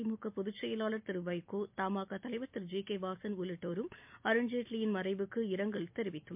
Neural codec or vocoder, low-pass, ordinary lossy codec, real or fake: none; 3.6 kHz; MP3, 32 kbps; real